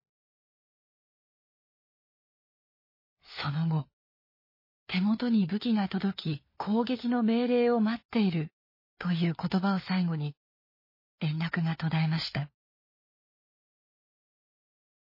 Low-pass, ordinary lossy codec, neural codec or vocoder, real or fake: 5.4 kHz; MP3, 24 kbps; codec, 16 kHz, 4 kbps, FunCodec, trained on LibriTTS, 50 frames a second; fake